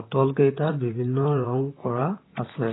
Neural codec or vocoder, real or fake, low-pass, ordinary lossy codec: vocoder, 44.1 kHz, 128 mel bands, Pupu-Vocoder; fake; 7.2 kHz; AAC, 16 kbps